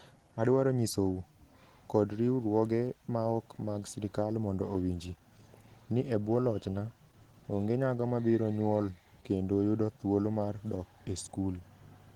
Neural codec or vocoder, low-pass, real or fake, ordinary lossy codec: none; 19.8 kHz; real; Opus, 16 kbps